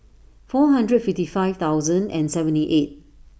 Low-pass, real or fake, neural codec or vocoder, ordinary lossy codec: none; real; none; none